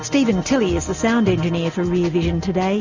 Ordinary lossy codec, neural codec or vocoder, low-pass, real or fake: Opus, 64 kbps; none; 7.2 kHz; real